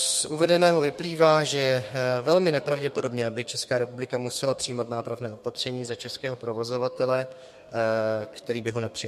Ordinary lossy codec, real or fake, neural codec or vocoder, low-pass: MP3, 64 kbps; fake; codec, 32 kHz, 1.9 kbps, SNAC; 14.4 kHz